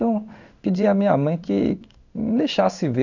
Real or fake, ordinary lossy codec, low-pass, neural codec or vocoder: fake; none; 7.2 kHz; codec, 16 kHz in and 24 kHz out, 1 kbps, XY-Tokenizer